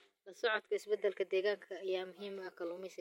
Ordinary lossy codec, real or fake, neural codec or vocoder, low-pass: MP3, 64 kbps; fake; vocoder, 44.1 kHz, 128 mel bands every 512 samples, BigVGAN v2; 19.8 kHz